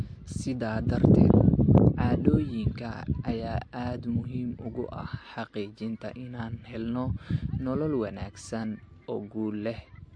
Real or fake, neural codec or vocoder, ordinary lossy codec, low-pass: real; none; MP3, 48 kbps; 9.9 kHz